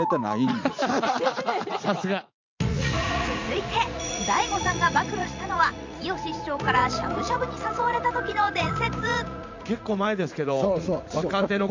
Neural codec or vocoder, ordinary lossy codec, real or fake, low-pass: vocoder, 44.1 kHz, 80 mel bands, Vocos; MP3, 64 kbps; fake; 7.2 kHz